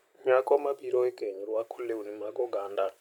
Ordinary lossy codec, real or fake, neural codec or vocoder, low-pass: none; real; none; 19.8 kHz